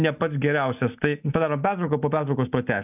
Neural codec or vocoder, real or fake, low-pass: none; real; 3.6 kHz